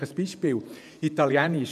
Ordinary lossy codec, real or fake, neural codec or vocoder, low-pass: none; fake; vocoder, 48 kHz, 128 mel bands, Vocos; 14.4 kHz